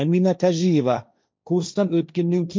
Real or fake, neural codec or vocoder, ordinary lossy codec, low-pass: fake; codec, 16 kHz, 1.1 kbps, Voila-Tokenizer; none; none